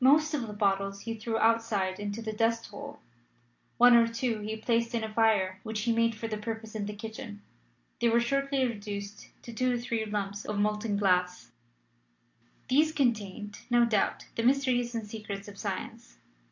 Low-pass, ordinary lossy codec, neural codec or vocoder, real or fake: 7.2 kHz; AAC, 48 kbps; none; real